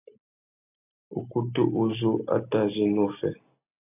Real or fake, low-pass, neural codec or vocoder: real; 3.6 kHz; none